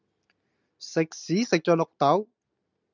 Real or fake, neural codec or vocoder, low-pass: real; none; 7.2 kHz